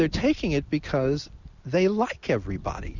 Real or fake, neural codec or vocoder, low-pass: real; none; 7.2 kHz